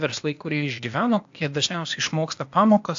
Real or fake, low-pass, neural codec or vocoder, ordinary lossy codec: fake; 7.2 kHz; codec, 16 kHz, 0.8 kbps, ZipCodec; AAC, 64 kbps